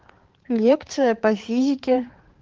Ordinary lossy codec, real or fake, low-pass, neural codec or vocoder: Opus, 16 kbps; fake; 7.2 kHz; codec, 16 kHz, 4 kbps, X-Codec, HuBERT features, trained on balanced general audio